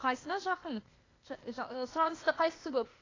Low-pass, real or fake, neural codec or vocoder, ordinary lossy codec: 7.2 kHz; fake; codec, 16 kHz, 0.8 kbps, ZipCodec; AAC, 32 kbps